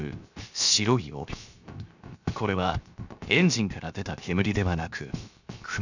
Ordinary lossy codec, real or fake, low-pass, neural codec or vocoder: none; fake; 7.2 kHz; codec, 16 kHz, 0.7 kbps, FocalCodec